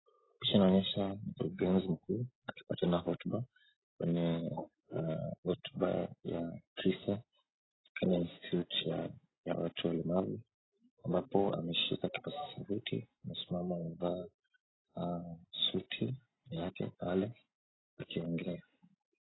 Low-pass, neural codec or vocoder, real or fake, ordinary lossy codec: 7.2 kHz; none; real; AAC, 16 kbps